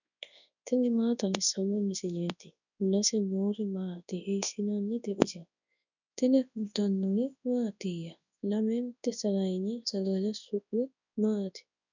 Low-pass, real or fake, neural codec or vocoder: 7.2 kHz; fake; codec, 24 kHz, 0.9 kbps, WavTokenizer, large speech release